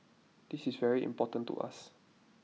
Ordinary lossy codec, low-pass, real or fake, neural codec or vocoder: none; none; real; none